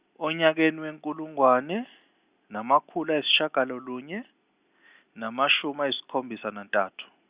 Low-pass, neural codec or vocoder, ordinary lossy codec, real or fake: 3.6 kHz; none; Opus, 64 kbps; real